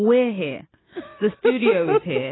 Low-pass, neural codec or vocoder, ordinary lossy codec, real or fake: 7.2 kHz; none; AAC, 16 kbps; real